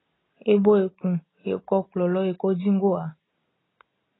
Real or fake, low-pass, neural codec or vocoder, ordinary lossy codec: real; 7.2 kHz; none; AAC, 16 kbps